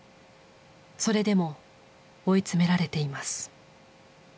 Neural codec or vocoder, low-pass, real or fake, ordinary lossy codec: none; none; real; none